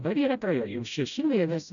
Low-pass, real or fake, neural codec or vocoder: 7.2 kHz; fake; codec, 16 kHz, 0.5 kbps, FreqCodec, smaller model